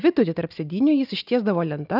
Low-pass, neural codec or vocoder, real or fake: 5.4 kHz; none; real